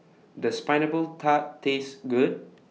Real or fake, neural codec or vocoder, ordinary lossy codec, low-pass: real; none; none; none